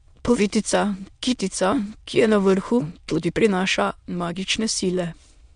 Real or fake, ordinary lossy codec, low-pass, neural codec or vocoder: fake; MP3, 64 kbps; 9.9 kHz; autoencoder, 22.05 kHz, a latent of 192 numbers a frame, VITS, trained on many speakers